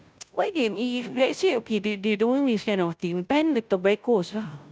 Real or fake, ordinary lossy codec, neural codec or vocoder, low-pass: fake; none; codec, 16 kHz, 0.5 kbps, FunCodec, trained on Chinese and English, 25 frames a second; none